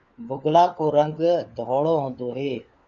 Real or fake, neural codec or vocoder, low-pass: fake; codec, 16 kHz, 4 kbps, FunCodec, trained on LibriTTS, 50 frames a second; 7.2 kHz